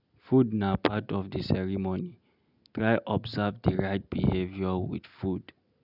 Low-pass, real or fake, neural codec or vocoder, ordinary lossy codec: 5.4 kHz; real; none; none